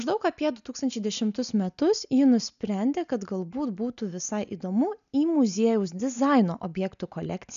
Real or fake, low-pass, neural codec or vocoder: real; 7.2 kHz; none